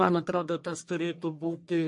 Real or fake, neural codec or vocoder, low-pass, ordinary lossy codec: fake; codec, 44.1 kHz, 1.7 kbps, Pupu-Codec; 10.8 kHz; MP3, 48 kbps